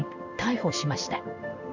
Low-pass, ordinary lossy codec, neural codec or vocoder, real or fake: 7.2 kHz; none; codec, 16 kHz in and 24 kHz out, 1 kbps, XY-Tokenizer; fake